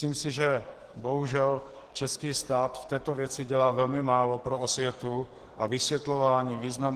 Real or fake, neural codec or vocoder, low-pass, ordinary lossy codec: fake; codec, 44.1 kHz, 2.6 kbps, SNAC; 14.4 kHz; Opus, 16 kbps